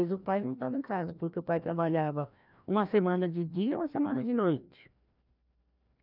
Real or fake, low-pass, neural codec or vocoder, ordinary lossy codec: fake; 5.4 kHz; codec, 16 kHz, 1 kbps, FreqCodec, larger model; none